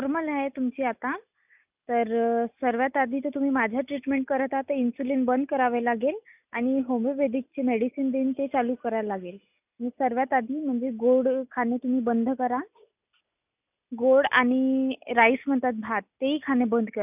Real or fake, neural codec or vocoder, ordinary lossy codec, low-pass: real; none; none; 3.6 kHz